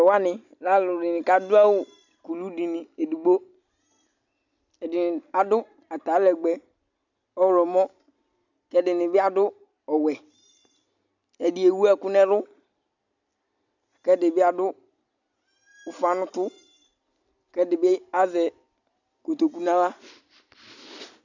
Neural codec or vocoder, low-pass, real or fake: none; 7.2 kHz; real